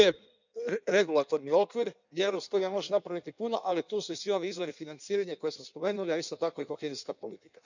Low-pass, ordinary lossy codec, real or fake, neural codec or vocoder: 7.2 kHz; none; fake; codec, 16 kHz in and 24 kHz out, 1.1 kbps, FireRedTTS-2 codec